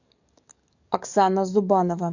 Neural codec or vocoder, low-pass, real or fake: codec, 44.1 kHz, 7.8 kbps, DAC; 7.2 kHz; fake